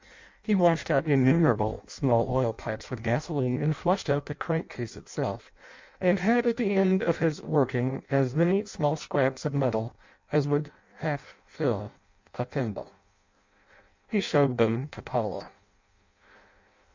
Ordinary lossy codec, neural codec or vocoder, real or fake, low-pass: MP3, 64 kbps; codec, 16 kHz in and 24 kHz out, 0.6 kbps, FireRedTTS-2 codec; fake; 7.2 kHz